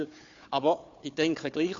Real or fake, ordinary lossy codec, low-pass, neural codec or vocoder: fake; AAC, 48 kbps; 7.2 kHz; codec, 16 kHz, 4 kbps, FunCodec, trained on Chinese and English, 50 frames a second